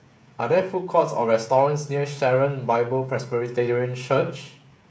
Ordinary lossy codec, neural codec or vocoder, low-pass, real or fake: none; codec, 16 kHz, 16 kbps, FreqCodec, smaller model; none; fake